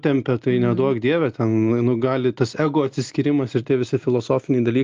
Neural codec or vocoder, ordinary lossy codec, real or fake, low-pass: none; Opus, 32 kbps; real; 7.2 kHz